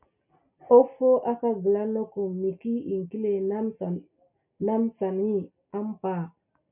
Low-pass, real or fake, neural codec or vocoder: 3.6 kHz; real; none